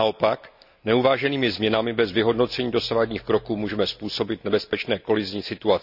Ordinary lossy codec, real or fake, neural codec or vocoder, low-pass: none; real; none; 5.4 kHz